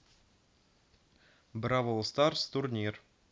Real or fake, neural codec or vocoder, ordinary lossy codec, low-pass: real; none; none; none